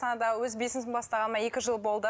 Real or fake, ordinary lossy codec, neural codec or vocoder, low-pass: real; none; none; none